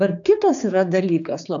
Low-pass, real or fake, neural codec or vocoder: 7.2 kHz; fake; codec, 16 kHz, 4 kbps, X-Codec, HuBERT features, trained on general audio